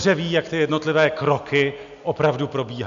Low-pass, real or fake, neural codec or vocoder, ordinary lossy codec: 7.2 kHz; real; none; AAC, 96 kbps